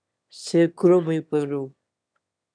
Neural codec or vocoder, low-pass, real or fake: autoencoder, 22.05 kHz, a latent of 192 numbers a frame, VITS, trained on one speaker; 9.9 kHz; fake